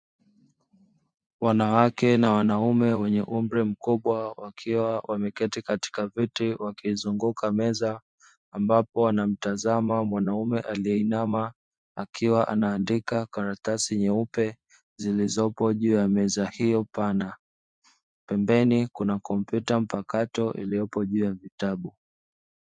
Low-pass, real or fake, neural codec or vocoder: 9.9 kHz; fake; vocoder, 24 kHz, 100 mel bands, Vocos